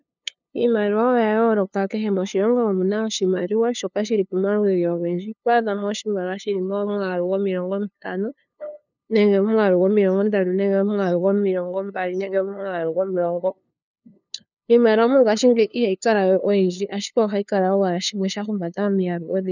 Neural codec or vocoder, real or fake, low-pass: codec, 16 kHz, 2 kbps, FunCodec, trained on LibriTTS, 25 frames a second; fake; 7.2 kHz